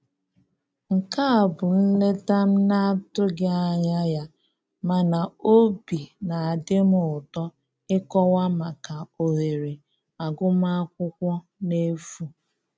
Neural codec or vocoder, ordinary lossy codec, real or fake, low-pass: none; none; real; none